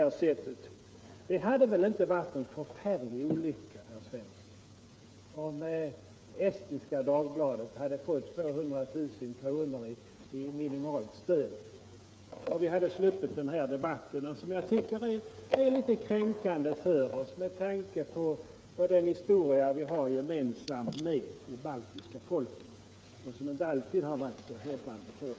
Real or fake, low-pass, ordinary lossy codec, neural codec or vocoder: fake; none; none; codec, 16 kHz, 8 kbps, FreqCodec, smaller model